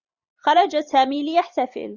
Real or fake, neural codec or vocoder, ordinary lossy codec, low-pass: fake; vocoder, 44.1 kHz, 128 mel bands every 256 samples, BigVGAN v2; Opus, 64 kbps; 7.2 kHz